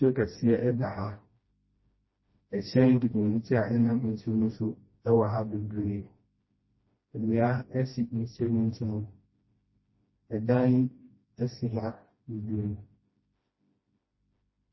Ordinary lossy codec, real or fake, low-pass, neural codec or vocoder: MP3, 24 kbps; fake; 7.2 kHz; codec, 16 kHz, 1 kbps, FreqCodec, smaller model